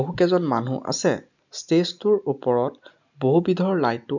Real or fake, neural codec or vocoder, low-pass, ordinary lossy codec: real; none; 7.2 kHz; none